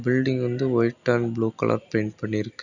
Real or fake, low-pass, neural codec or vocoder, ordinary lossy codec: real; 7.2 kHz; none; none